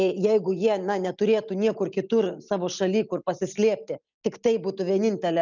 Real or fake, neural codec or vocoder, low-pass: real; none; 7.2 kHz